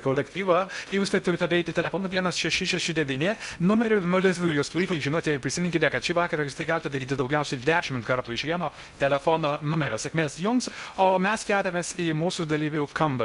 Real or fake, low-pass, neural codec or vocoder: fake; 10.8 kHz; codec, 16 kHz in and 24 kHz out, 0.6 kbps, FocalCodec, streaming, 2048 codes